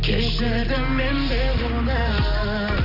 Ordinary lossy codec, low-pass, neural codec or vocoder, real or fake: none; 5.4 kHz; none; real